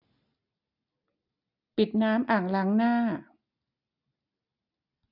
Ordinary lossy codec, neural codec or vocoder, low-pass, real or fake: none; none; 5.4 kHz; real